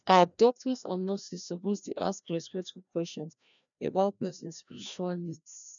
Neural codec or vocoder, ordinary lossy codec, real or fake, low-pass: codec, 16 kHz, 1 kbps, FreqCodec, larger model; none; fake; 7.2 kHz